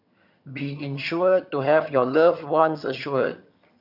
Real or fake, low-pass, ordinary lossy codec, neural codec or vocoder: fake; 5.4 kHz; none; vocoder, 22.05 kHz, 80 mel bands, HiFi-GAN